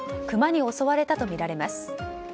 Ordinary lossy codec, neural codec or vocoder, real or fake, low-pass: none; none; real; none